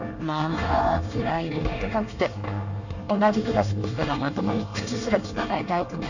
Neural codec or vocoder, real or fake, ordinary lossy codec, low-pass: codec, 24 kHz, 1 kbps, SNAC; fake; none; 7.2 kHz